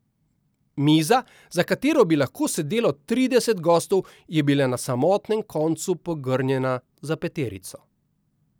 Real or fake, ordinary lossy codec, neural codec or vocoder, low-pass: real; none; none; none